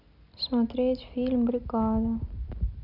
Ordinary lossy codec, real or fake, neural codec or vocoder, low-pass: none; real; none; 5.4 kHz